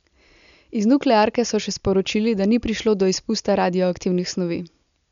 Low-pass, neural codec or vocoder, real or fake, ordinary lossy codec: 7.2 kHz; none; real; none